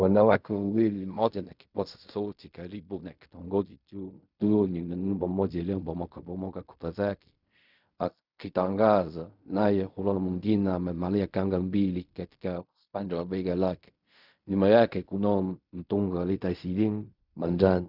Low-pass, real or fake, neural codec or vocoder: 5.4 kHz; fake; codec, 16 kHz in and 24 kHz out, 0.4 kbps, LongCat-Audio-Codec, fine tuned four codebook decoder